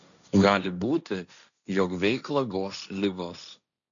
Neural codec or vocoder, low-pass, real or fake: codec, 16 kHz, 1.1 kbps, Voila-Tokenizer; 7.2 kHz; fake